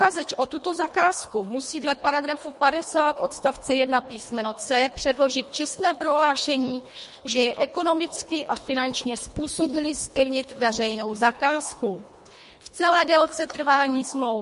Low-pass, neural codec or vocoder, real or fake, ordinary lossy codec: 10.8 kHz; codec, 24 kHz, 1.5 kbps, HILCodec; fake; MP3, 48 kbps